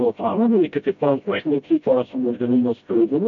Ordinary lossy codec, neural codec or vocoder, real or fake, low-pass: AAC, 64 kbps; codec, 16 kHz, 0.5 kbps, FreqCodec, smaller model; fake; 7.2 kHz